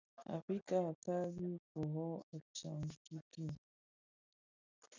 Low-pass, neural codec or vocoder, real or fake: 7.2 kHz; none; real